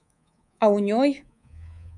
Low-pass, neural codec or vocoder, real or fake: 10.8 kHz; codec, 24 kHz, 3.1 kbps, DualCodec; fake